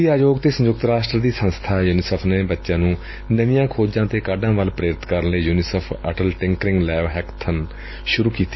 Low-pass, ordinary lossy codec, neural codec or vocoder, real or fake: 7.2 kHz; MP3, 24 kbps; none; real